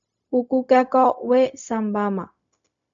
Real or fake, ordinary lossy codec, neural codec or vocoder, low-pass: fake; AAC, 64 kbps; codec, 16 kHz, 0.4 kbps, LongCat-Audio-Codec; 7.2 kHz